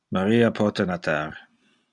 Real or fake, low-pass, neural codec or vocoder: real; 10.8 kHz; none